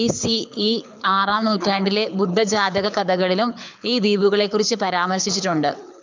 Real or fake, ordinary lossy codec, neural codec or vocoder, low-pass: fake; MP3, 64 kbps; codec, 24 kHz, 6 kbps, HILCodec; 7.2 kHz